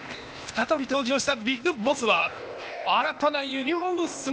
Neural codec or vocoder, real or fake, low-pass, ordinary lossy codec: codec, 16 kHz, 0.8 kbps, ZipCodec; fake; none; none